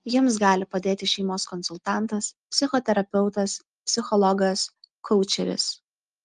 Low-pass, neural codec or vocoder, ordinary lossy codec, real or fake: 10.8 kHz; none; Opus, 32 kbps; real